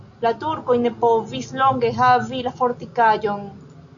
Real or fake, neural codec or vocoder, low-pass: real; none; 7.2 kHz